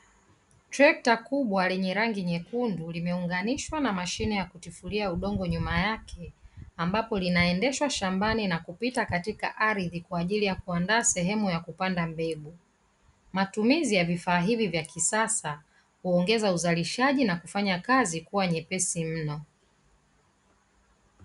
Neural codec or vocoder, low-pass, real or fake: none; 10.8 kHz; real